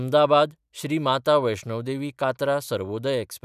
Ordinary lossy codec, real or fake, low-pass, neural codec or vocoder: none; real; 14.4 kHz; none